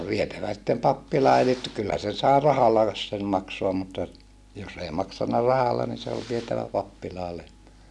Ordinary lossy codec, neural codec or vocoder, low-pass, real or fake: none; none; none; real